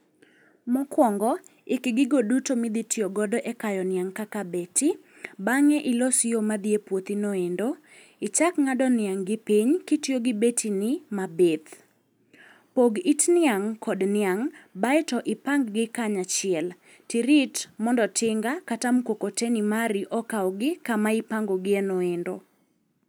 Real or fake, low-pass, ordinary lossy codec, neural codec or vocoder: real; none; none; none